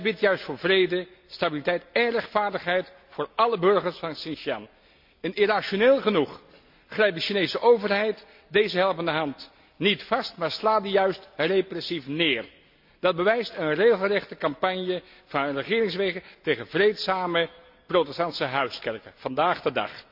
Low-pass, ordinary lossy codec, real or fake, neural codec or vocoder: 5.4 kHz; MP3, 48 kbps; real; none